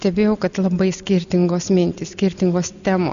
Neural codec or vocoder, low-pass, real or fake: none; 7.2 kHz; real